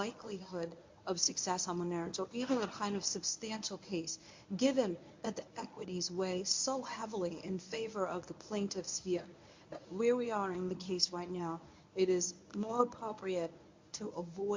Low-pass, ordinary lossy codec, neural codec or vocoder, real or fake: 7.2 kHz; MP3, 48 kbps; codec, 24 kHz, 0.9 kbps, WavTokenizer, medium speech release version 1; fake